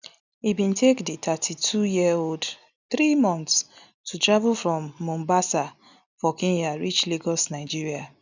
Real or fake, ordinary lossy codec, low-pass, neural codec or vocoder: real; none; 7.2 kHz; none